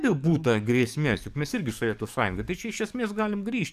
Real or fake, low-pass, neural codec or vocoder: fake; 14.4 kHz; codec, 44.1 kHz, 7.8 kbps, DAC